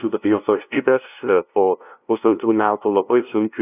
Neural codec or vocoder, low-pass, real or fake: codec, 16 kHz, 0.5 kbps, FunCodec, trained on LibriTTS, 25 frames a second; 3.6 kHz; fake